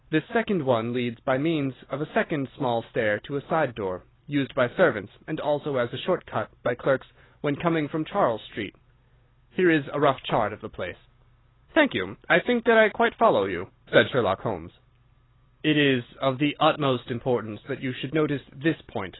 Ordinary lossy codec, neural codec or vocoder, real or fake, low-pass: AAC, 16 kbps; none; real; 7.2 kHz